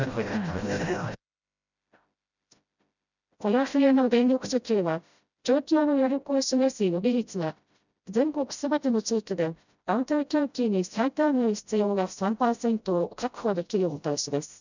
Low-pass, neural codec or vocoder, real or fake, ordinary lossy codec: 7.2 kHz; codec, 16 kHz, 0.5 kbps, FreqCodec, smaller model; fake; none